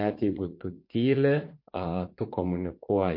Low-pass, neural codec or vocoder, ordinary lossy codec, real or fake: 5.4 kHz; vocoder, 44.1 kHz, 80 mel bands, Vocos; MP3, 32 kbps; fake